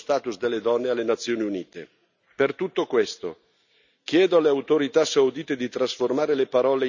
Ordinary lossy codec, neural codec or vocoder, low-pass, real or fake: none; none; 7.2 kHz; real